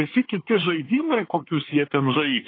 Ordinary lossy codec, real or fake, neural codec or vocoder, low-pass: AAC, 32 kbps; fake; codec, 24 kHz, 1 kbps, SNAC; 5.4 kHz